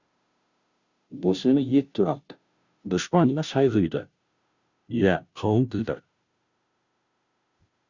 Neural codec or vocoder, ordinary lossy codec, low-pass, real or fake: codec, 16 kHz, 0.5 kbps, FunCodec, trained on Chinese and English, 25 frames a second; Opus, 64 kbps; 7.2 kHz; fake